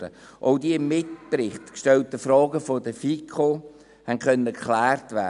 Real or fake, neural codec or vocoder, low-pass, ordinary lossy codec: real; none; 10.8 kHz; none